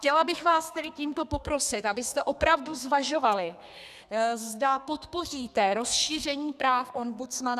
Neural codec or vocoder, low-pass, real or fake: codec, 32 kHz, 1.9 kbps, SNAC; 14.4 kHz; fake